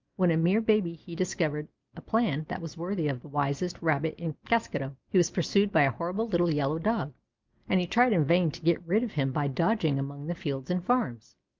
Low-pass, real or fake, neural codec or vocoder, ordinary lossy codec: 7.2 kHz; real; none; Opus, 32 kbps